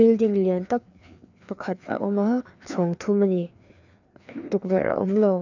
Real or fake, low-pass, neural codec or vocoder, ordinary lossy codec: fake; 7.2 kHz; codec, 16 kHz, 2 kbps, FreqCodec, larger model; none